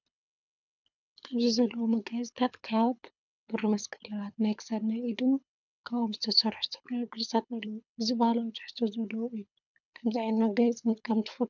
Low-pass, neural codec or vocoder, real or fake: 7.2 kHz; codec, 24 kHz, 6 kbps, HILCodec; fake